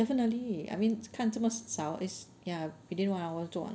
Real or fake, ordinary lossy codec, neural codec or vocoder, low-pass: real; none; none; none